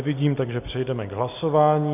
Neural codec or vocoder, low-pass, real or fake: none; 3.6 kHz; real